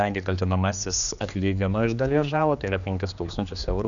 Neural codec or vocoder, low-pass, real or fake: codec, 16 kHz, 2 kbps, X-Codec, HuBERT features, trained on general audio; 7.2 kHz; fake